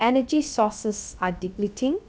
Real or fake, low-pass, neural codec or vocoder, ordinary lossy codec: fake; none; codec, 16 kHz, about 1 kbps, DyCAST, with the encoder's durations; none